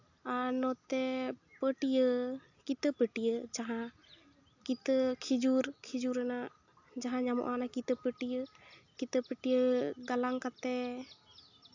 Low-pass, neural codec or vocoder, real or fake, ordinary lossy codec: 7.2 kHz; none; real; AAC, 48 kbps